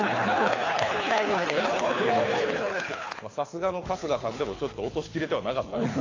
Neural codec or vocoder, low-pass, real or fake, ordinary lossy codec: codec, 24 kHz, 6 kbps, HILCodec; 7.2 kHz; fake; AAC, 32 kbps